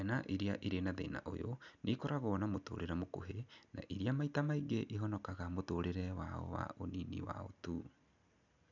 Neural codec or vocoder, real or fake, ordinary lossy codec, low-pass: none; real; none; 7.2 kHz